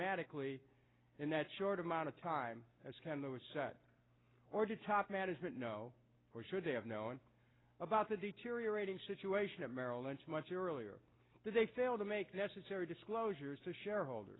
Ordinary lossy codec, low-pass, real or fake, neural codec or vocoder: AAC, 16 kbps; 7.2 kHz; real; none